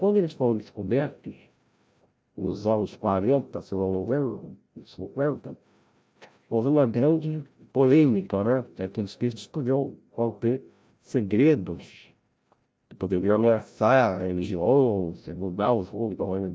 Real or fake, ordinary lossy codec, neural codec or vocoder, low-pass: fake; none; codec, 16 kHz, 0.5 kbps, FreqCodec, larger model; none